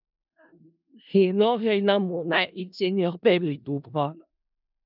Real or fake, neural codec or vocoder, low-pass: fake; codec, 16 kHz in and 24 kHz out, 0.4 kbps, LongCat-Audio-Codec, four codebook decoder; 5.4 kHz